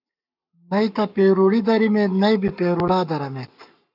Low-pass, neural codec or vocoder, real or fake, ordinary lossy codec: 5.4 kHz; codec, 44.1 kHz, 7.8 kbps, Pupu-Codec; fake; AAC, 48 kbps